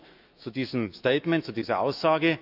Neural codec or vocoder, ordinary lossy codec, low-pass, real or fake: autoencoder, 48 kHz, 128 numbers a frame, DAC-VAE, trained on Japanese speech; none; 5.4 kHz; fake